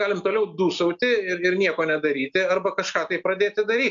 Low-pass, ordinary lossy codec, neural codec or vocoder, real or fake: 7.2 kHz; MP3, 64 kbps; none; real